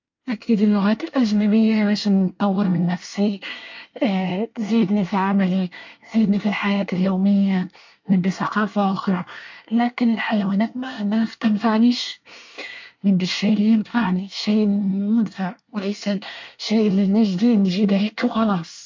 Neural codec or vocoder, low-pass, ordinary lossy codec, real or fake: codec, 24 kHz, 1 kbps, SNAC; 7.2 kHz; MP3, 48 kbps; fake